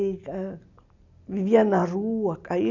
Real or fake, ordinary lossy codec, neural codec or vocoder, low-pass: real; none; none; 7.2 kHz